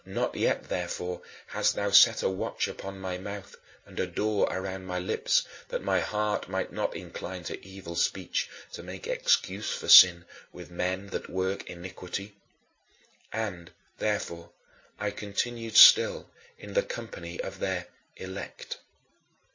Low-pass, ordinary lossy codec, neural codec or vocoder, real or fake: 7.2 kHz; MP3, 32 kbps; none; real